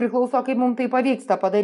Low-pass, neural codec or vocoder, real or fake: 10.8 kHz; none; real